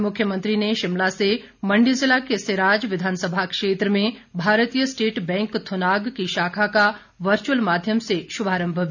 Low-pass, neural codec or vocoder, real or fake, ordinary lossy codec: 7.2 kHz; none; real; none